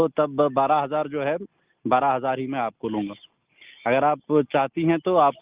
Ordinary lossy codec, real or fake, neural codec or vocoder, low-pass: Opus, 24 kbps; real; none; 3.6 kHz